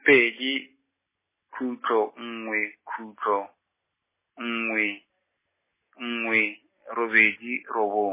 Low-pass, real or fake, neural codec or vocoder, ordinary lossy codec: 3.6 kHz; real; none; MP3, 16 kbps